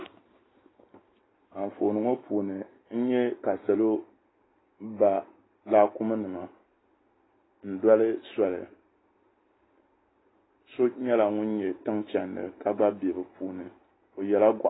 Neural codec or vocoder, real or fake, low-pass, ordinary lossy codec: none; real; 7.2 kHz; AAC, 16 kbps